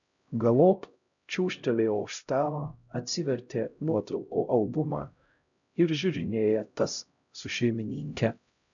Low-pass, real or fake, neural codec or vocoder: 7.2 kHz; fake; codec, 16 kHz, 0.5 kbps, X-Codec, HuBERT features, trained on LibriSpeech